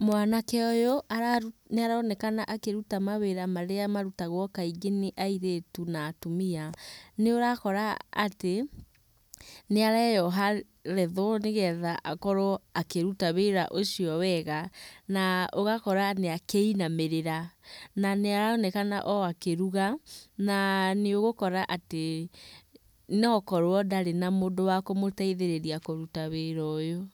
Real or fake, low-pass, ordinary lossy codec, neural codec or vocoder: real; none; none; none